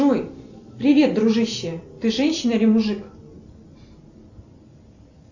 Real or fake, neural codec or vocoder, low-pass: real; none; 7.2 kHz